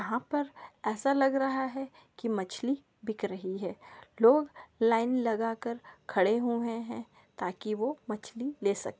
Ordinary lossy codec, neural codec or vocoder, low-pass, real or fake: none; none; none; real